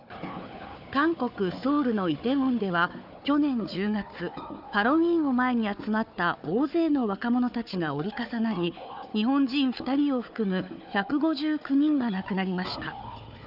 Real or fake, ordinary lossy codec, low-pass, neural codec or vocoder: fake; none; 5.4 kHz; codec, 16 kHz, 4 kbps, FunCodec, trained on Chinese and English, 50 frames a second